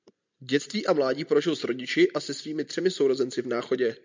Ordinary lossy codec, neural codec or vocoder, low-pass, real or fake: MP3, 64 kbps; none; 7.2 kHz; real